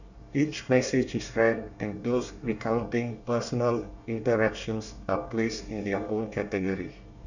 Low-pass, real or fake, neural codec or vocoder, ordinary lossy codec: 7.2 kHz; fake; codec, 24 kHz, 1 kbps, SNAC; none